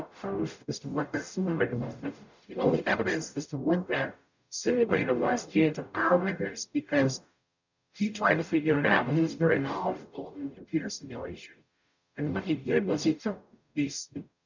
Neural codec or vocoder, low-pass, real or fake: codec, 44.1 kHz, 0.9 kbps, DAC; 7.2 kHz; fake